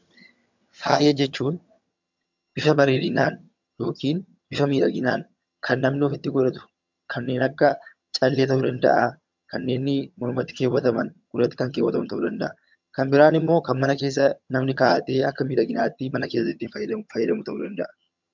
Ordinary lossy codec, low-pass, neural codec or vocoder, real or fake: MP3, 64 kbps; 7.2 kHz; vocoder, 22.05 kHz, 80 mel bands, HiFi-GAN; fake